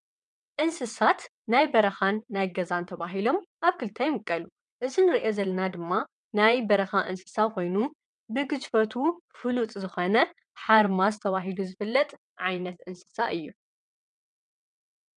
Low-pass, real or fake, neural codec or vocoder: 9.9 kHz; fake; vocoder, 22.05 kHz, 80 mel bands, WaveNeXt